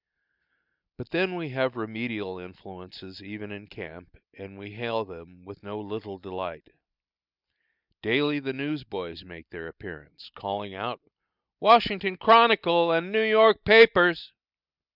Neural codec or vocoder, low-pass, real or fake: none; 5.4 kHz; real